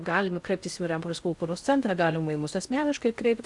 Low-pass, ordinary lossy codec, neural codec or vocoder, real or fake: 10.8 kHz; Opus, 64 kbps; codec, 16 kHz in and 24 kHz out, 0.6 kbps, FocalCodec, streaming, 4096 codes; fake